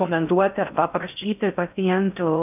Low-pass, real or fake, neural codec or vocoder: 3.6 kHz; fake; codec, 16 kHz in and 24 kHz out, 0.6 kbps, FocalCodec, streaming, 4096 codes